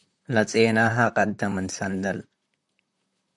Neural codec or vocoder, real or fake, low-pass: vocoder, 44.1 kHz, 128 mel bands, Pupu-Vocoder; fake; 10.8 kHz